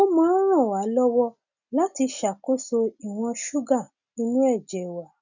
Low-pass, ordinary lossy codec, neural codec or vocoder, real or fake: 7.2 kHz; none; none; real